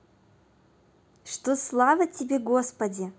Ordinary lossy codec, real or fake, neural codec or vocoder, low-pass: none; real; none; none